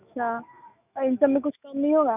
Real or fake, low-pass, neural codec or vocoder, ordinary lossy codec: fake; 3.6 kHz; autoencoder, 48 kHz, 128 numbers a frame, DAC-VAE, trained on Japanese speech; none